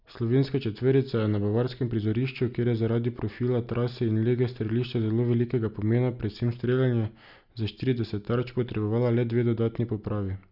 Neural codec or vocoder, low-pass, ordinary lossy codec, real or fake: none; 5.4 kHz; none; real